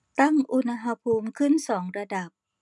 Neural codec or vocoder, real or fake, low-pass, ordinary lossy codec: none; real; 10.8 kHz; none